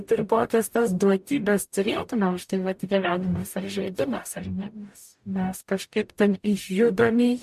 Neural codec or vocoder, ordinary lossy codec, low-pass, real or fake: codec, 44.1 kHz, 0.9 kbps, DAC; MP3, 64 kbps; 14.4 kHz; fake